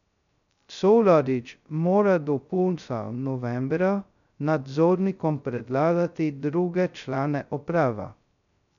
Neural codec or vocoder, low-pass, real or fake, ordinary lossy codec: codec, 16 kHz, 0.2 kbps, FocalCodec; 7.2 kHz; fake; none